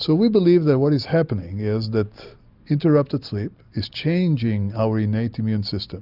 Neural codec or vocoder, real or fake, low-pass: none; real; 5.4 kHz